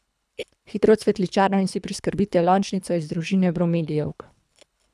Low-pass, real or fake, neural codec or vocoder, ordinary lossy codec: none; fake; codec, 24 kHz, 3 kbps, HILCodec; none